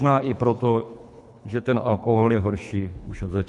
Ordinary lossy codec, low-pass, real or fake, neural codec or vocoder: MP3, 96 kbps; 10.8 kHz; fake; codec, 24 kHz, 3 kbps, HILCodec